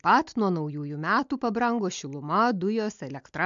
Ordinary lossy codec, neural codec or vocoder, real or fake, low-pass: MP3, 64 kbps; none; real; 7.2 kHz